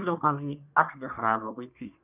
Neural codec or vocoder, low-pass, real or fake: codec, 24 kHz, 1 kbps, SNAC; 3.6 kHz; fake